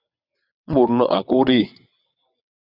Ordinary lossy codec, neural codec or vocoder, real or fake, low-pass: Opus, 64 kbps; vocoder, 22.05 kHz, 80 mel bands, WaveNeXt; fake; 5.4 kHz